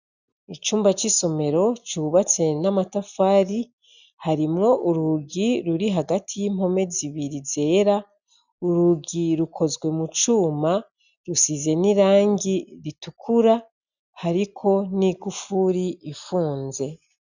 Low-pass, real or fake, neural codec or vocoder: 7.2 kHz; real; none